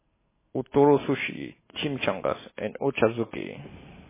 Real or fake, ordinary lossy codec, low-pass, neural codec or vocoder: real; MP3, 16 kbps; 3.6 kHz; none